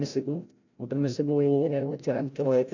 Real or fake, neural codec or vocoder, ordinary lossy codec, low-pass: fake; codec, 16 kHz, 0.5 kbps, FreqCodec, larger model; none; 7.2 kHz